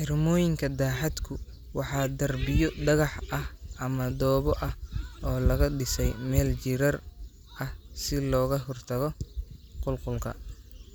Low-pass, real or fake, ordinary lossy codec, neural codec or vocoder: none; real; none; none